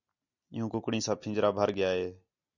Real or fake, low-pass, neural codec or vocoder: real; 7.2 kHz; none